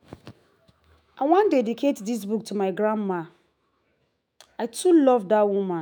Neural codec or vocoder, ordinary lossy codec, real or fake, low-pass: autoencoder, 48 kHz, 128 numbers a frame, DAC-VAE, trained on Japanese speech; none; fake; none